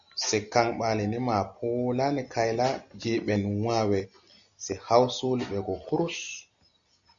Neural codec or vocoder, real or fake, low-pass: none; real; 7.2 kHz